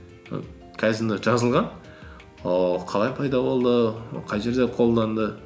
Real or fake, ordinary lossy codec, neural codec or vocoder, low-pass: real; none; none; none